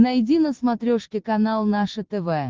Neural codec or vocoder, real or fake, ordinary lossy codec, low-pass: none; real; Opus, 16 kbps; 7.2 kHz